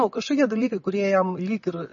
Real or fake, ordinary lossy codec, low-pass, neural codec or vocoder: real; MP3, 32 kbps; 7.2 kHz; none